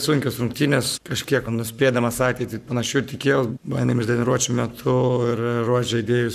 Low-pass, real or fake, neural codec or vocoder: 14.4 kHz; fake; codec, 44.1 kHz, 7.8 kbps, Pupu-Codec